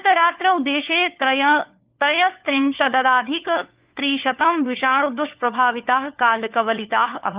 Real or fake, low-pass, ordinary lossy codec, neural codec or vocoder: fake; 3.6 kHz; Opus, 32 kbps; codec, 16 kHz, 4 kbps, FunCodec, trained on LibriTTS, 50 frames a second